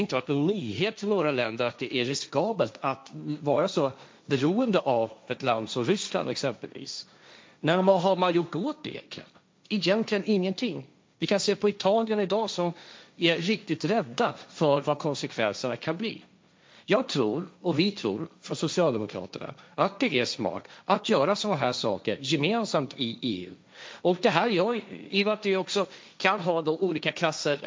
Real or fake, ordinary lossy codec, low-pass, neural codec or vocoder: fake; none; none; codec, 16 kHz, 1.1 kbps, Voila-Tokenizer